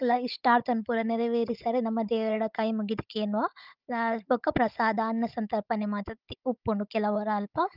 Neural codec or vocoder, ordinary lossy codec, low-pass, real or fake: codec, 16 kHz, 16 kbps, FunCodec, trained on Chinese and English, 50 frames a second; Opus, 24 kbps; 5.4 kHz; fake